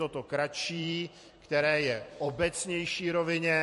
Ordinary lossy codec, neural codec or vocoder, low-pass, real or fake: MP3, 48 kbps; vocoder, 44.1 kHz, 128 mel bands every 256 samples, BigVGAN v2; 14.4 kHz; fake